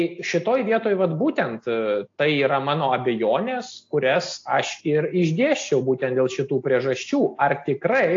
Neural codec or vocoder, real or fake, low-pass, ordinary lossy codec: none; real; 7.2 kHz; AAC, 48 kbps